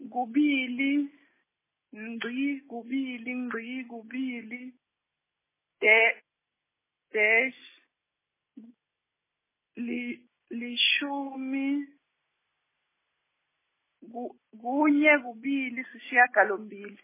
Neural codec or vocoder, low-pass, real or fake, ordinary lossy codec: none; 3.6 kHz; real; MP3, 16 kbps